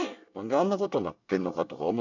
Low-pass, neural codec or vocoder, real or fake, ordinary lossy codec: 7.2 kHz; codec, 24 kHz, 1 kbps, SNAC; fake; none